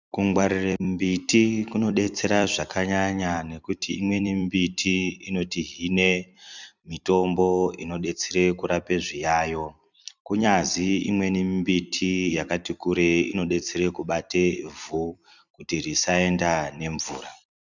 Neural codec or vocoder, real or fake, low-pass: vocoder, 44.1 kHz, 128 mel bands every 256 samples, BigVGAN v2; fake; 7.2 kHz